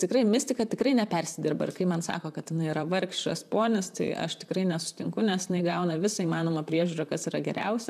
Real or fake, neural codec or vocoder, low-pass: fake; vocoder, 44.1 kHz, 128 mel bands, Pupu-Vocoder; 14.4 kHz